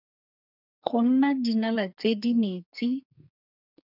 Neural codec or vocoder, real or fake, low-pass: codec, 44.1 kHz, 2.6 kbps, SNAC; fake; 5.4 kHz